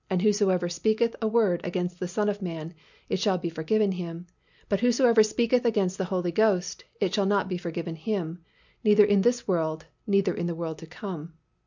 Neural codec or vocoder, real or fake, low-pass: none; real; 7.2 kHz